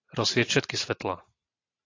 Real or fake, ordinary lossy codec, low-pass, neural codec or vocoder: fake; AAC, 32 kbps; 7.2 kHz; vocoder, 44.1 kHz, 128 mel bands every 512 samples, BigVGAN v2